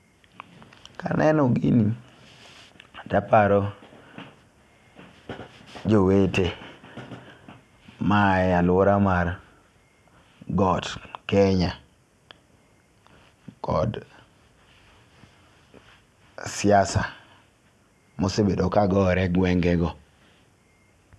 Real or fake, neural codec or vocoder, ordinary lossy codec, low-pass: real; none; none; none